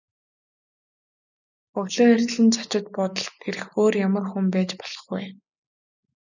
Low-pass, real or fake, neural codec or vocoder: 7.2 kHz; real; none